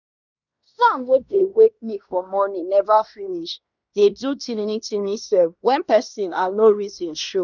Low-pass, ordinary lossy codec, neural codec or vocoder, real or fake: 7.2 kHz; none; codec, 16 kHz in and 24 kHz out, 0.9 kbps, LongCat-Audio-Codec, fine tuned four codebook decoder; fake